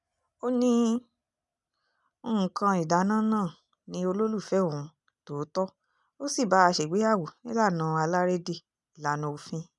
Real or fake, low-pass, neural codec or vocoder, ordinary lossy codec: real; 10.8 kHz; none; none